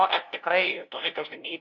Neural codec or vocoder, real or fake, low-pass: codec, 16 kHz, 0.5 kbps, FunCodec, trained on LibriTTS, 25 frames a second; fake; 7.2 kHz